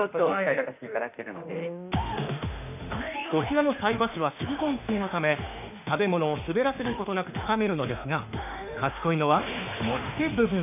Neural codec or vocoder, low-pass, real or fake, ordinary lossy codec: autoencoder, 48 kHz, 32 numbers a frame, DAC-VAE, trained on Japanese speech; 3.6 kHz; fake; none